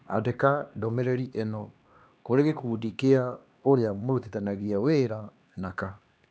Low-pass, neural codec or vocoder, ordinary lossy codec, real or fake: none; codec, 16 kHz, 2 kbps, X-Codec, HuBERT features, trained on LibriSpeech; none; fake